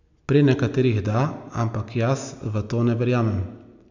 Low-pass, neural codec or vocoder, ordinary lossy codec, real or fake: 7.2 kHz; none; none; real